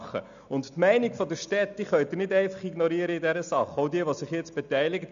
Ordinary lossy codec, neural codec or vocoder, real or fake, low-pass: none; none; real; 7.2 kHz